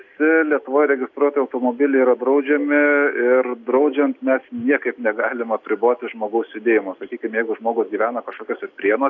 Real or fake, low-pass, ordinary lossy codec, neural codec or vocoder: real; 7.2 kHz; AAC, 48 kbps; none